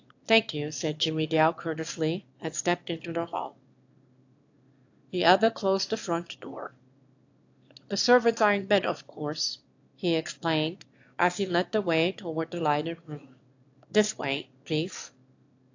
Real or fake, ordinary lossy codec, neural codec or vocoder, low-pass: fake; AAC, 48 kbps; autoencoder, 22.05 kHz, a latent of 192 numbers a frame, VITS, trained on one speaker; 7.2 kHz